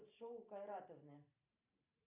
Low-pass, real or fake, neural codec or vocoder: 3.6 kHz; fake; vocoder, 44.1 kHz, 128 mel bands every 512 samples, BigVGAN v2